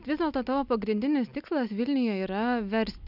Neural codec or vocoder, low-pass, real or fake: none; 5.4 kHz; real